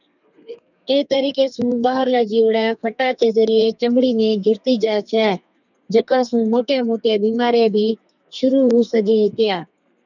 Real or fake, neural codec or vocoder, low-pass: fake; codec, 32 kHz, 1.9 kbps, SNAC; 7.2 kHz